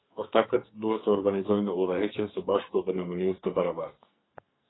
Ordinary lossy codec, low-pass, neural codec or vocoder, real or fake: AAC, 16 kbps; 7.2 kHz; codec, 44.1 kHz, 2.6 kbps, SNAC; fake